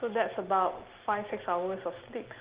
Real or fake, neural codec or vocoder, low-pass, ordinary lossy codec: real; none; 3.6 kHz; Opus, 16 kbps